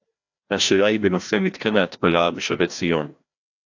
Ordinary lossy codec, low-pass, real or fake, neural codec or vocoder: AAC, 48 kbps; 7.2 kHz; fake; codec, 16 kHz, 1 kbps, FreqCodec, larger model